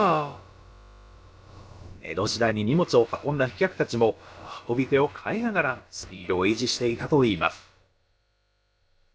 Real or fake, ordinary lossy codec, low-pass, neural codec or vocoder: fake; none; none; codec, 16 kHz, about 1 kbps, DyCAST, with the encoder's durations